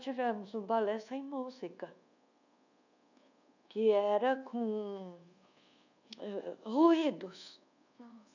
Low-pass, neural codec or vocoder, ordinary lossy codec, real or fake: 7.2 kHz; codec, 24 kHz, 1.2 kbps, DualCodec; none; fake